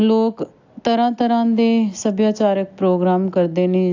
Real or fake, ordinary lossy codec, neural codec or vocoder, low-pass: real; AAC, 48 kbps; none; 7.2 kHz